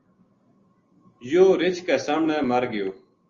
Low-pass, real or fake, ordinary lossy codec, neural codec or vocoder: 7.2 kHz; real; Opus, 32 kbps; none